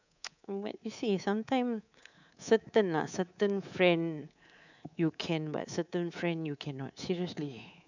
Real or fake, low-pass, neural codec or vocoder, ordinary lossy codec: fake; 7.2 kHz; codec, 24 kHz, 3.1 kbps, DualCodec; none